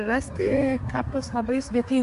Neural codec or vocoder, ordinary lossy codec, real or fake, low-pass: codec, 24 kHz, 1 kbps, SNAC; AAC, 96 kbps; fake; 10.8 kHz